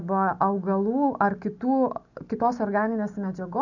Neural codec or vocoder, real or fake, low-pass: none; real; 7.2 kHz